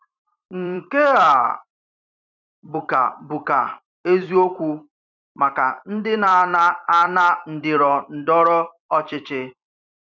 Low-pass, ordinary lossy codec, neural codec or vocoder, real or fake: 7.2 kHz; none; vocoder, 44.1 kHz, 128 mel bands every 256 samples, BigVGAN v2; fake